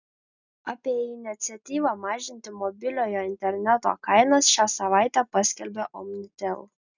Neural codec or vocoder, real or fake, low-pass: none; real; 7.2 kHz